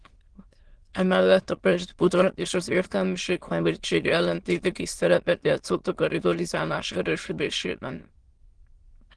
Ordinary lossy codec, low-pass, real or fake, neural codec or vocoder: Opus, 16 kbps; 9.9 kHz; fake; autoencoder, 22.05 kHz, a latent of 192 numbers a frame, VITS, trained on many speakers